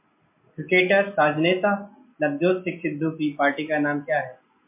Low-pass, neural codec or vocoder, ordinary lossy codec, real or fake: 3.6 kHz; none; MP3, 32 kbps; real